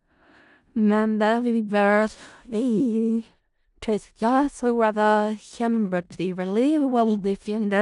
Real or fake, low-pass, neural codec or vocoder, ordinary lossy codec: fake; 10.8 kHz; codec, 16 kHz in and 24 kHz out, 0.4 kbps, LongCat-Audio-Codec, four codebook decoder; none